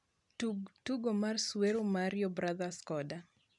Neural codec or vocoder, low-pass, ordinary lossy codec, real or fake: none; 10.8 kHz; none; real